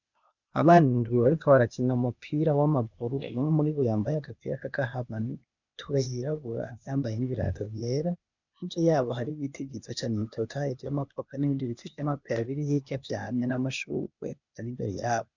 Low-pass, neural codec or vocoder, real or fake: 7.2 kHz; codec, 16 kHz, 0.8 kbps, ZipCodec; fake